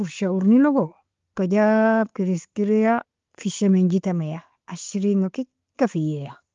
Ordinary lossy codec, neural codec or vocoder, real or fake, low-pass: Opus, 32 kbps; none; real; 7.2 kHz